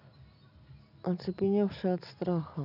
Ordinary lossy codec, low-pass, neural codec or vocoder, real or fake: none; 5.4 kHz; none; real